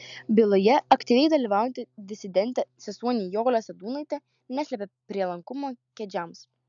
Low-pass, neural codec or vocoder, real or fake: 7.2 kHz; none; real